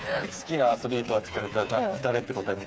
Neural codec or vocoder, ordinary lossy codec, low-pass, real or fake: codec, 16 kHz, 4 kbps, FreqCodec, smaller model; none; none; fake